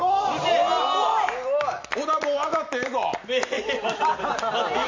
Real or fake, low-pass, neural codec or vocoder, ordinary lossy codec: real; 7.2 kHz; none; MP3, 48 kbps